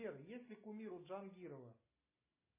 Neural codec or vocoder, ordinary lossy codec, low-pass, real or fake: none; MP3, 16 kbps; 3.6 kHz; real